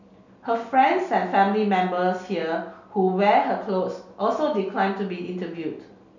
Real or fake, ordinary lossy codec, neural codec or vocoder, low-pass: real; none; none; 7.2 kHz